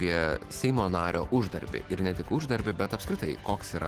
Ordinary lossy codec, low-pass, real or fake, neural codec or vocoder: Opus, 16 kbps; 14.4 kHz; fake; autoencoder, 48 kHz, 128 numbers a frame, DAC-VAE, trained on Japanese speech